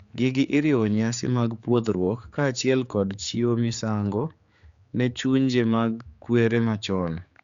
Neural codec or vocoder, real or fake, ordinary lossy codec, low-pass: codec, 16 kHz, 4 kbps, X-Codec, HuBERT features, trained on general audio; fake; Opus, 64 kbps; 7.2 kHz